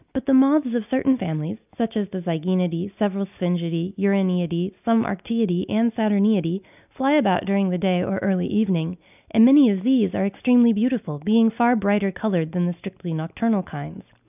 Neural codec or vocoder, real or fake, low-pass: none; real; 3.6 kHz